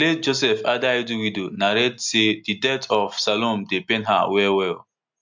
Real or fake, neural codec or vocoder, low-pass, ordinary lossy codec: real; none; 7.2 kHz; MP3, 64 kbps